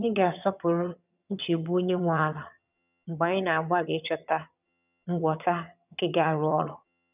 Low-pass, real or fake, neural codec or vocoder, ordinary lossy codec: 3.6 kHz; fake; vocoder, 22.05 kHz, 80 mel bands, HiFi-GAN; none